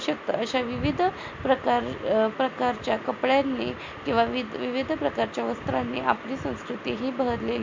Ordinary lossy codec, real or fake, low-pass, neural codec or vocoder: MP3, 48 kbps; real; 7.2 kHz; none